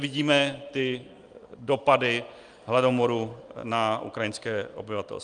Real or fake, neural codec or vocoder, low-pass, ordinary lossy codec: real; none; 9.9 kHz; Opus, 32 kbps